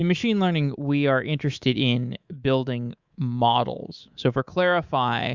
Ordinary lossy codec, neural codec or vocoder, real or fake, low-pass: Opus, 64 kbps; codec, 24 kHz, 3.1 kbps, DualCodec; fake; 7.2 kHz